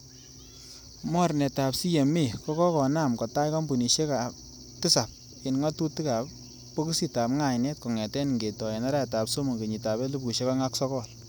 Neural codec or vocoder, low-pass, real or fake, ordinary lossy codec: none; none; real; none